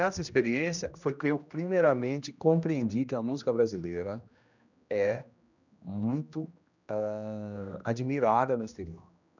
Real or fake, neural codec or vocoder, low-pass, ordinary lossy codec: fake; codec, 16 kHz, 1 kbps, X-Codec, HuBERT features, trained on general audio; 7.2 kHz; none